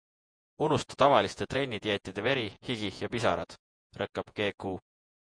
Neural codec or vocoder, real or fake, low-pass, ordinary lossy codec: vocoder, 48 kHz, 128 mel bands, Vocos; fake; 9.9 kHz; MP3, 64 kbps